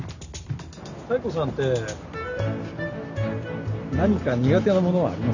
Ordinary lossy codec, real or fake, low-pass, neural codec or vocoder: none; real; 7.2 kHz; none